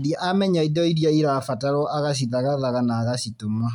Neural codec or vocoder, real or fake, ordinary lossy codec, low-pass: none; real; none; 19.8 kHz